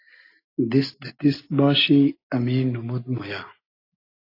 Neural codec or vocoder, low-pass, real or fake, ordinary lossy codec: none; 5.4 kHz; real; AAC, 32 kbps